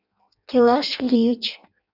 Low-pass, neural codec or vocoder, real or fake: 5.4 kHz; codec, 16 kHz in and 24 kHz out, 0.6 kbps, FireRedTTS-2 codec; fake